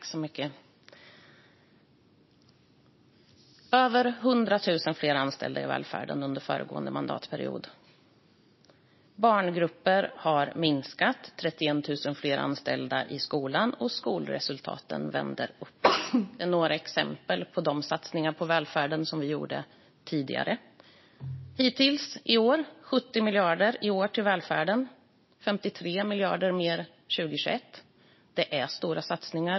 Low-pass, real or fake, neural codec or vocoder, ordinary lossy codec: 7.2 kHz; real; none; MP3, 24 kbps